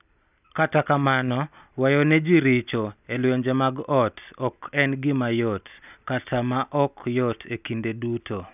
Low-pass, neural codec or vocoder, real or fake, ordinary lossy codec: 3.6 kHz; none; real; none